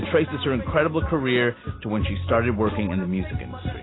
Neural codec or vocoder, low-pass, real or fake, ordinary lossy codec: none; 7.2 kHz; real; AAC, 16 kbps